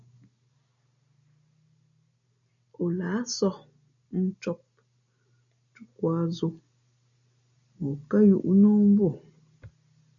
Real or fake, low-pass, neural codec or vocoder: real; 7.2 kHz; none